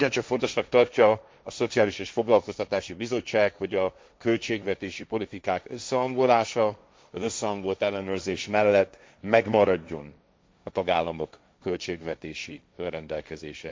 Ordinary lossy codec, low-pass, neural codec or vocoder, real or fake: none; none; codec, 16 kHz, 1.1 kbps, Voila-Tokenizer; fake